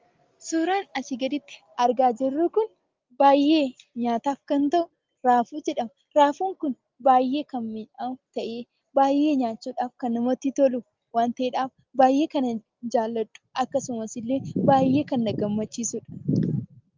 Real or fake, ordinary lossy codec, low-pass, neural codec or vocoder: real; Opus, 32 kbps; 7.2 kHz; none